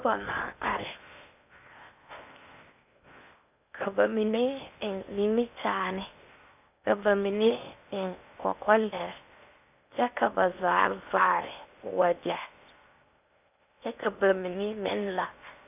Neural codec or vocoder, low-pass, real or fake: codec, 16 kHz in and 24 kHz out, 0.8 kbps, FocalCodec, streaming, 65536 codes; 3.6 kHz; fake